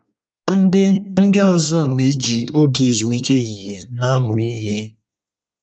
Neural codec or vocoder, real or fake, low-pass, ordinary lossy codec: codec, 24 kHz, 1 kbps, SNAC; fake; 9.9 kHz; none